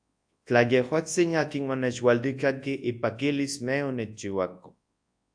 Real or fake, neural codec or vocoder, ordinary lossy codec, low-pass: fake; codec, 24 kHz, 0.9 kbps, WavTokenizer, large speech release; AAC, 64 kbps; 9.9 kHz